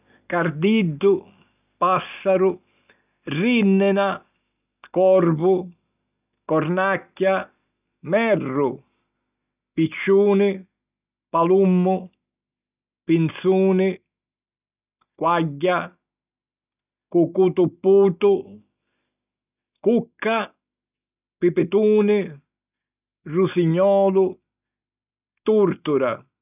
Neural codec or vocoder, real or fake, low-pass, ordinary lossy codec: none; real; 3.6 kHz; none